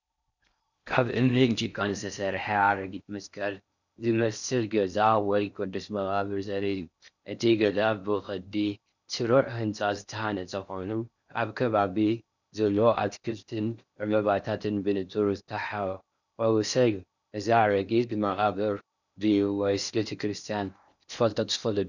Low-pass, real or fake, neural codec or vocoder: 7.2 kHz; fake; codec, 16 kHz in and 24 kHz out, 0.6 kbps, FocalCodec, streaming, 4096 codes